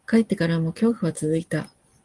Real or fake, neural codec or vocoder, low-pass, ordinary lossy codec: real; none; 10.8 kHz; Opus, 24 kbps